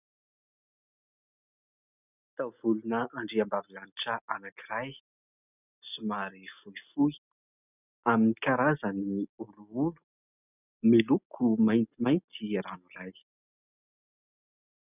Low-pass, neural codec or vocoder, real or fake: 3.6 kHz; none; real